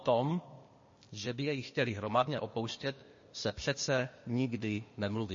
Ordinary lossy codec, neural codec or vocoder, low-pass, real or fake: MP3, 32 kbps; codec, 16 kHz, 0.8 kbps, ZipCodec; 7.2 kHz; fake